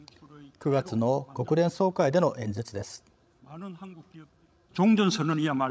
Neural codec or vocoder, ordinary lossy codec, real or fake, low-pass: codec, 16 kHz, 16 kbps, FreqCodec, larger model; none; fake; none